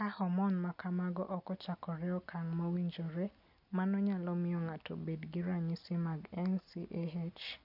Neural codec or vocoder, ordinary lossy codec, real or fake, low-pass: none; none; real; 5.4 kHz